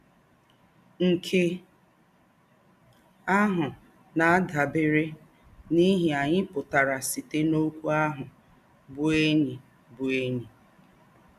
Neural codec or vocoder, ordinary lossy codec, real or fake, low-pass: none; none; real; 14.4 kHz